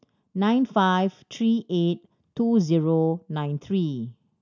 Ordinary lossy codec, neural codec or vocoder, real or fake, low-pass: none; none; real; 7.2 kHz